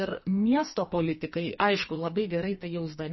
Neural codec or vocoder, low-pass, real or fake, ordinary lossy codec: codec, 44.1 kHz, 2.6 kbps, SNAC; 7.2 kHz; fake; MP3, 24 kbps